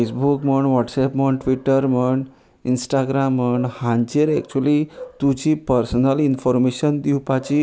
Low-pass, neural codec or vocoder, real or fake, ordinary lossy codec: none; none; real; none